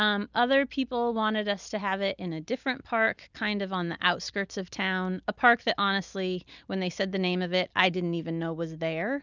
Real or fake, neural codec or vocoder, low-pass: real; none; 7.2 kHz